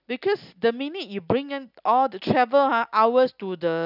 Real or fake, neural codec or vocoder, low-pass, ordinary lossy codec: real; none; 5.4 kHz; none